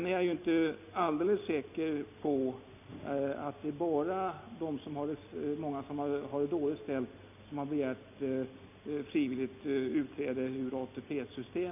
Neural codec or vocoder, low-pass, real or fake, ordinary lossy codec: none; 3.6 kHz; real; none